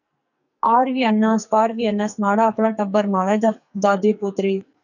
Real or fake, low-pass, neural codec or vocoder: fake; 7.2 kHz; codec, 44.1 kHz, 2.6 kbps, SNAC